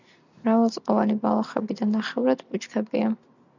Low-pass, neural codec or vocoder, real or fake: 7.2 kHz; none; real